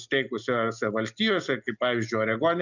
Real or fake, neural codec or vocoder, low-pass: real; none; 7.2 kHz